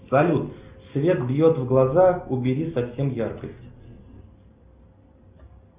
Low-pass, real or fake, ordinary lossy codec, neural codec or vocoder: 3.6 kHz; real; Opus, 64 kbps; none